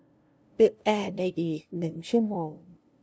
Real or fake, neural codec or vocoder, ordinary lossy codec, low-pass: fake; codec, 16 kHz, 0.5 kbps, FunCodec, trained on LibriTTS, 25 frames a second; none; none